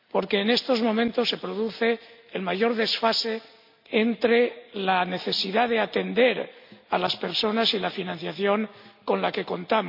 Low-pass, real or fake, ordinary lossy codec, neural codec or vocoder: 5.4 kHz; real; MP3, 48 kbps; none